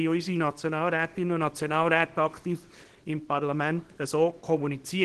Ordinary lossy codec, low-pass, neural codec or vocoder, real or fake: Opus, 16 kbps; 10.8 kHz; codec, 24 kHz, 0.9 kbps, WavTokenizer, small release; fake